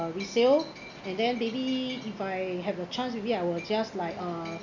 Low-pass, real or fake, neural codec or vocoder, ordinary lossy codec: 7.2 kHz; real; none; none